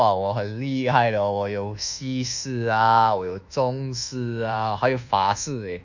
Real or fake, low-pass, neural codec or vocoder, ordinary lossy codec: fake; 7.2 kHz; codec, 24 kHz, 1.2 kbps, DualCodec; none